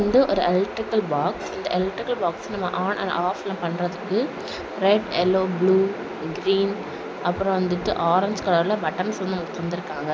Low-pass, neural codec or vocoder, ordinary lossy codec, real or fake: none; none; none; real